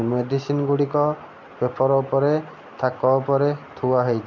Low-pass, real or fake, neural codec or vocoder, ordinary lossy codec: 7.2 kHz; real; none; none